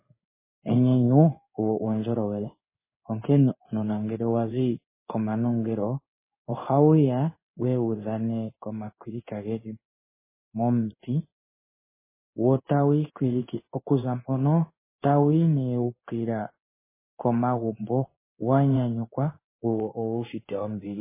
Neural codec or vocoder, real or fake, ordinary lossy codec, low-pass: codec, 16 kHz in and 24 kHz out, 1 kbps, XY-Tokenizer; fake; MP3, 16 kbps; 3.6 kHz